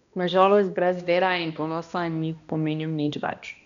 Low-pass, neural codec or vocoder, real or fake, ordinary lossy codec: 7.2 kHz; codec, 16 kHz, 1 kbps, X-Codec, HuBERT features, trained on balanced general audio; fake; none